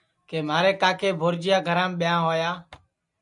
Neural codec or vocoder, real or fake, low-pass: none; real; 10.8 kHz